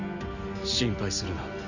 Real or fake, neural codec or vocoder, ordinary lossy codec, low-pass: real; none; none; 7.2 kHz